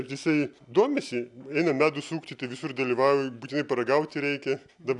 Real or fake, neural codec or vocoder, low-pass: real; none; 10.8 kHz